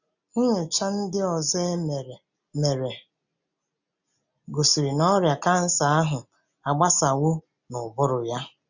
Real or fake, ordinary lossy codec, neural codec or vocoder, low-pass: real; none; none; 7.2 kHz